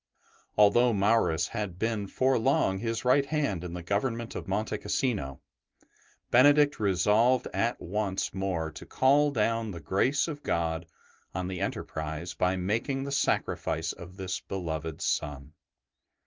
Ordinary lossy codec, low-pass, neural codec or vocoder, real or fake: Opus, 32 kbps; 7.2 kHz; none; real